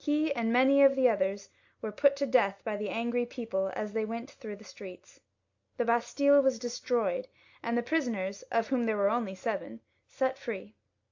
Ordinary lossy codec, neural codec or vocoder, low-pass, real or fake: AAC, 48 kbps; none; 7.2 kHz; real